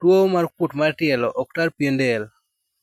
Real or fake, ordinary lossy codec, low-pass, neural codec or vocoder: real; none; 19.8 kHz; none